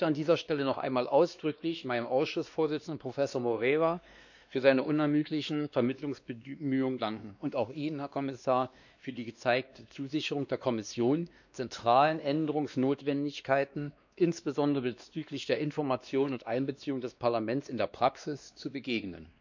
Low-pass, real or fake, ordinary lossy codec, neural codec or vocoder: 7.2 kHz; fake; none; codec, 16 kHz, 2 kbps, X-Codec, WavLM features, trained on Multilingual LibriSpeech